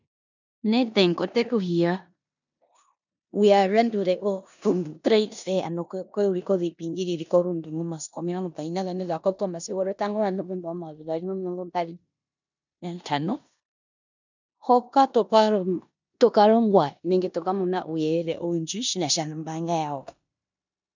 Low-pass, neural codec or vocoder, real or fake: 7.2 kHz; codec, 16 kHz in and 24 kHz out, 0.9 kbps, LongCat-Audio-Codec, four codebook decoder; fake